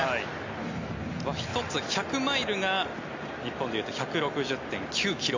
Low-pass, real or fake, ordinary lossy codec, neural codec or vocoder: 7.2 kHz; real; MP3, 48 kbps; none